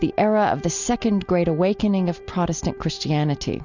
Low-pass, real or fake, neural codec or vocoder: 7.2 kHz; real; none